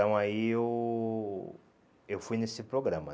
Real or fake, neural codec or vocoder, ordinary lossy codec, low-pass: real; none; none; none